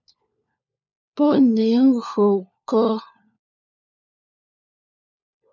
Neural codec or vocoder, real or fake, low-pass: codec, 16 kHz, 4 kbps, FunCodec, trained on LibriTTS, 50 frames a second; fake; 7.2 kHz